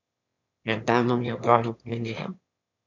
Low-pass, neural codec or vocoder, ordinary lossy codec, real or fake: 7.2 kHz; autoencoder, 22.05 kHz, a latent of 192 numbers a frame, VITS, trained on one speaker; none; fake